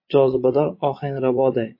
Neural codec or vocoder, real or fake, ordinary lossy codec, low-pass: vocoder, 22.05 kHz, 80 mel bands, Vocos; fake; MP3, 32 kbps; 5.4 kHz